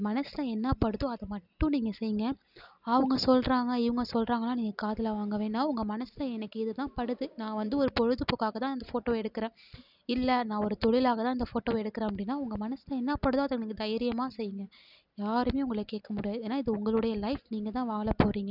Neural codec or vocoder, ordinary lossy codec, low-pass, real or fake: none; none; 5.4 kHz; real